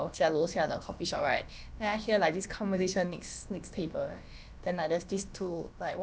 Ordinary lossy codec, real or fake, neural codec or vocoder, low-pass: none; fake; codec, 16 kHz, about 1 kbps, DyCAST, with the encoder's durations; none